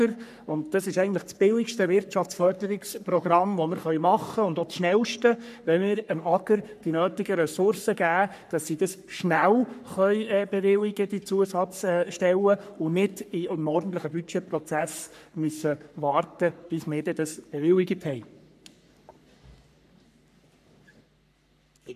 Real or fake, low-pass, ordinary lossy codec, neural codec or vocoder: fake; 14.4 kHz; none; codec, 44.1 kHz, 3.4 kbps, Pupu-Codec